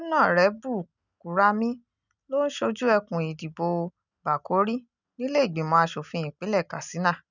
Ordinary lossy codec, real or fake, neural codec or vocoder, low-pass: none; real; none; 7.2 kHz